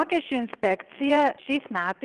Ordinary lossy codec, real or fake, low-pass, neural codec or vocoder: Opus, 24 kbps; fake; 9.9 kHz; vocoder, 22.05 kHz, 80 mel bands, WaveNeXt